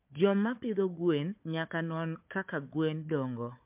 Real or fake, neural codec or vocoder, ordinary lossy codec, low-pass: fake; codec, 16 kHz, 4 kbps, FunCodec, trained on Chinese and English, 50 frames a second; MP3, 32 kbps; 3.6 kHz